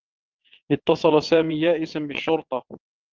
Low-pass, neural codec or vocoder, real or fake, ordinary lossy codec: 7.2 kHz; vocoder, 22.05 kHz, 80 mel bands, WaveNeXt; fake; Opus, 32 kbps